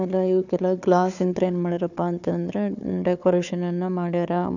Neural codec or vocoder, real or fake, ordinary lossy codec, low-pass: codec, 16 kHz, 4 kbps, FunCodec, trained on Chinese and English, 50 frames a second; fake; none; 7.2 kHz